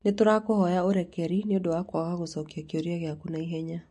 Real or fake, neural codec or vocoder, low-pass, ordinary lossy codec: real; none; 10.8 kHz; MP3, 48 kbps